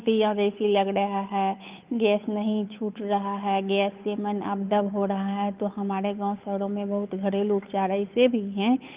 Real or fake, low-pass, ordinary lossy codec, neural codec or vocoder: fake; 3.6 kHz; Opus, 32 kbps; codec, 16 kHz, 8 kbps, FunCodec, trained on Chinese and English, 25 frames a second